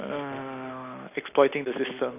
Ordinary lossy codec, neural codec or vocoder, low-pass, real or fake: none; none; 3.6 kHz; real